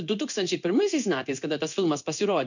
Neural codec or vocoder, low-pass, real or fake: codec, 16 kHz in and 24 kHz out, 1 kbps, XY-Tokenizer; 7.2 kHz; fake